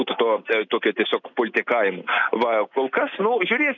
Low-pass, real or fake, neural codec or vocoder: 7.2 kHz; real; none